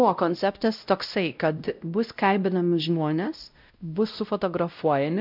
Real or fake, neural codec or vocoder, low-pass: fake; codec, 16 kHz, 0.5 kbps, X-Codec, WavLM features, trained on Multilingual LibriSpeech; 5.4 kHz